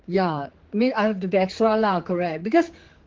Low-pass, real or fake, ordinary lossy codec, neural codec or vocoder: 7.2 kHz; fake; Opus, 16 kbps; codec, 16 kHz, 4 kbps, X-Codec, HuBERT features, trained on general audio